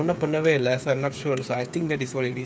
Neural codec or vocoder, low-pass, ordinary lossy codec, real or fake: codec, 16 kHz, 8 kbps, FreqCodec, smaller model; none; none; fake